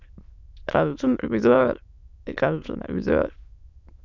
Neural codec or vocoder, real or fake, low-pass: autoencoder, 22.05 kHz, a latent of 192 numbers a frame, VITS, trained on many speakers; fake; 7.2 kHz